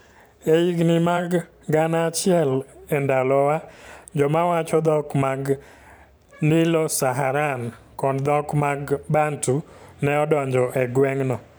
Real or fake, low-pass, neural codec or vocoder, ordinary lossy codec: fake; none; vocoder, 44.1 kHz, 128 mel bands every 512 samples, BigVGAN v2; none